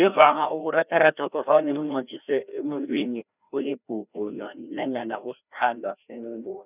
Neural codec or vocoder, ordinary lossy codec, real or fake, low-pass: codec, 16 kHz, 1 kbps, FreqCodec, larger model; none; fake; 3.6 kHz